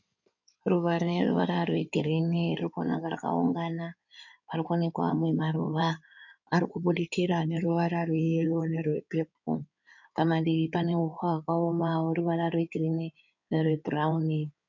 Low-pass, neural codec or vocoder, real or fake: 7.2 kHz; codec, 16 kHz in and 24 kHz out, 2.2 kbps, FireRedTTS-2 codec; fake